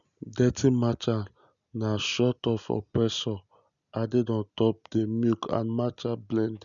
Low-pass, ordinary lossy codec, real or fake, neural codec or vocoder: 7.2 kHz; none; real; none